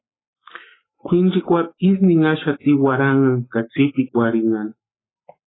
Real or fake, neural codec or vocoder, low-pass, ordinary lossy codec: fake; codec, 44.1 kHz, 7.8 kbps, Pupu-Codec; 7.2 kHz; AAC, 16 kbps